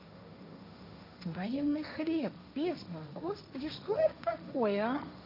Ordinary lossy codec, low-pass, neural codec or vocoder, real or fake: none; 5.4 kHz; codec, 16 kHz, 1.1 kbps, Voila-Tokenizer; fake